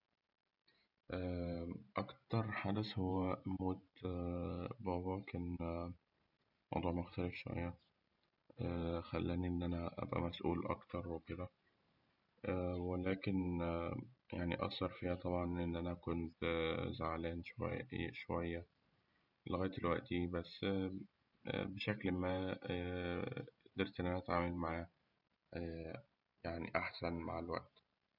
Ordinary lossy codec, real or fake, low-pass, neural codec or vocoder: none; fake; 5.4 kHz; vocoder, 44.1 kHz, 128 mel bands every 512 samples, BigVGAN v2